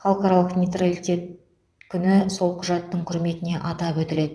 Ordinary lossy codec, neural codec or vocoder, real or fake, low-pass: none; vocoder, 22.05 kHz, 80 mel bands, WaveNeXt; fake; none